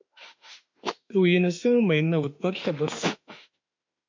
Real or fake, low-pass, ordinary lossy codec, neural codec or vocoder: fake; 7.2 kHz; MP3, 64 kbps; autoencoder, 48 kHz, 32 numbers a frame, DAC-VAE, trained on Japanese speech